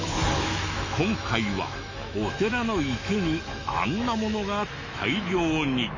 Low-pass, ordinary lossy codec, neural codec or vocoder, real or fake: 7.2 kHz; MP3, 32 kbps; autoencoder, 48 kHz, 128 numbers a frame, DAC-VAE, trained on Japanese speech; fake